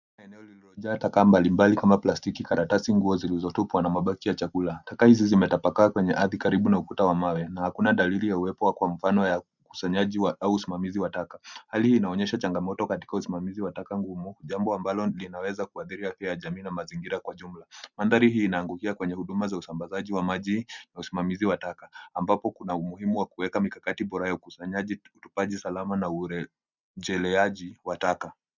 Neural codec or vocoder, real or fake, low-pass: none; real; 7.2 kHz